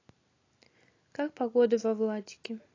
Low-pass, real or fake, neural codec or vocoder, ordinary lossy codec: 7.2 kHz; real; none; AAC, 32 kbps